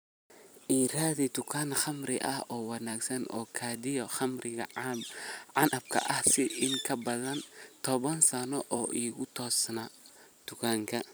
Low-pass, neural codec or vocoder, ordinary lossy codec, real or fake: none; vocoder, 44.1 kHz, 128 mel bands every 256 samples, BigVGAN v2; none; fake